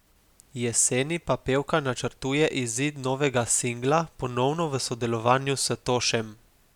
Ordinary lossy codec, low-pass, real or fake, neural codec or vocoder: none; 19.8 kHz; real; none